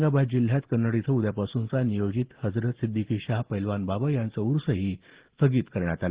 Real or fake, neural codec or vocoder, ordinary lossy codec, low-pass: real; none; Opus, 16 kbps; 3.6 kHz